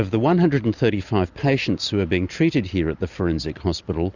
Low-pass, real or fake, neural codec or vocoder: 7.2 kHz; real; none